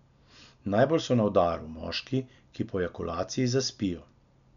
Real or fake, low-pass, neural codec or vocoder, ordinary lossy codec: real; 7.2 kHz; none; none